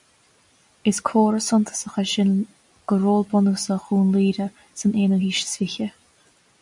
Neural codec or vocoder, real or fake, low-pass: none; real; 10.8 kHz